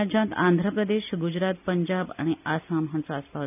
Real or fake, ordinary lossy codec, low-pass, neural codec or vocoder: real; none; 3.6 kHz; none